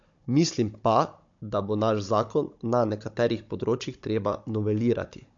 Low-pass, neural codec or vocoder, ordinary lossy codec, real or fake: 7.2 kHz; codec, 16 kHz, 16 kbps, FunCodec, trained on Chinese and English, 50 frames a second; MP3, 48 kbps; fake